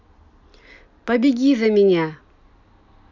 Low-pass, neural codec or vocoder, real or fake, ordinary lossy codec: 7.2 kHz; none; real; none